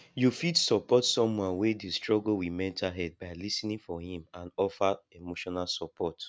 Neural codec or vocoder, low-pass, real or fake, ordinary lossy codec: none; none; real; none